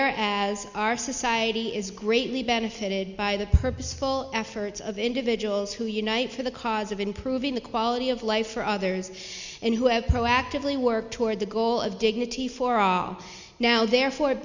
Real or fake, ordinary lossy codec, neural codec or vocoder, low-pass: real; Opus, 64 kbps; none; 7.2 kHz